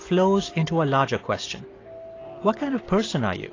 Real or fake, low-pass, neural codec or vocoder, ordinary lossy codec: real; 7.2 kHz; none; AAC, 32 kbps